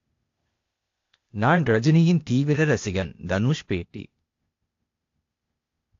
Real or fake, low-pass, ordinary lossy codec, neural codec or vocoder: fake; 7.2 kHz; AAC, 48 kbps; codec, 16 kHz, 0.8 kbps, ZipCodec